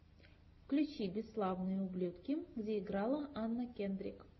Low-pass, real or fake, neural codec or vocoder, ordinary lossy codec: 7.2 kHz; real; none; MP3, 24 kbps